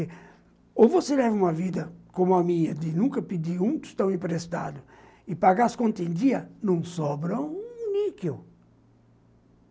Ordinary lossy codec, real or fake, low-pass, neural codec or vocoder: none; real; none; none